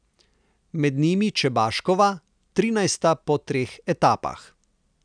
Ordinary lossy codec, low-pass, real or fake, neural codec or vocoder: none; 9.9 kHz; real; none